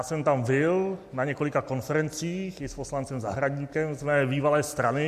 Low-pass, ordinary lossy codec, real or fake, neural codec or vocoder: 14.4 kHz; MP3, 64 kbps; real; none